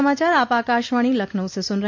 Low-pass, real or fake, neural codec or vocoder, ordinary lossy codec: 7.2 kHz; real; none; MP3, 48 kbps